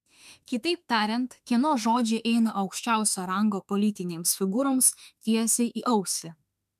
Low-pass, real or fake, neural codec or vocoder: 14.4 kHz; fake; autoencoder, 48 kHz, 32 numbers a frame, DAC-VAE, trained on Japanese speech